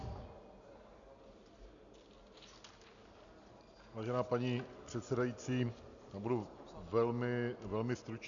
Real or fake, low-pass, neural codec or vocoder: real; 7.2 kHz; none